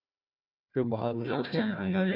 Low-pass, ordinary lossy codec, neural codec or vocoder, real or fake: 5.4 kHz; Opus, 64 kbps; codec, 16 kHz, 1 kbps, FunCodec, trained on Chinese and English, 50 frames a second; fake